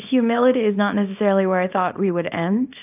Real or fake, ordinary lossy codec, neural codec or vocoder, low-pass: fake; AAC, 32 kbps; codec, 24 kHz, 0.9 kbps, WavTokenizer, small release; 3.6 kHz